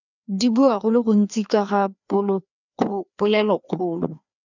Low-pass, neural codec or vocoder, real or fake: 7.2 kHz; codec, 16 kHz, 2 kbps, FreqCodec, larger model; fake